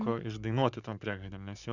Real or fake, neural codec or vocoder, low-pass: fake; codec, 44.1 kHz, 7.8 kbps, Pupu-Codec; 7.2 kHz